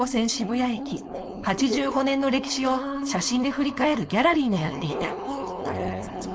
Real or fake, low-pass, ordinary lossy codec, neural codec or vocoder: fake; none; none; codec, 16 kHz, 4.8 kbps, FACodec